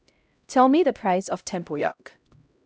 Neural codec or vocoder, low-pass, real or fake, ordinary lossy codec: codec, 16 kHz, 0.5 kbps, X-Codec, HuBERT features, trained on LibriSpeech; none; fake; none